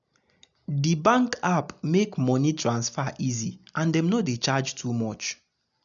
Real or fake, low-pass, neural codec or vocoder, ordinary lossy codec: real; 7.2 kHz; none; none